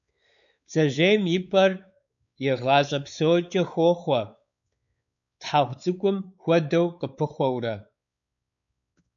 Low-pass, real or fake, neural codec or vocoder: 7.2 kHz; fake; codec, 16 kHz, 4 kbps, X-Codec, WavLM features, trained on Multilingual LibriSpeech